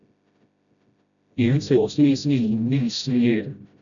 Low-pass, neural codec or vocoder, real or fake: 7.2 kHz; codec, 16 kHz, 0.5 kbps, FreqCodec, smaller model; fake